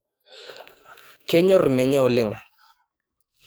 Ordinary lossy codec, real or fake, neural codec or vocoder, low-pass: none; fake; codec, 44.1 kHz, 2.6 kbps, SNAC; none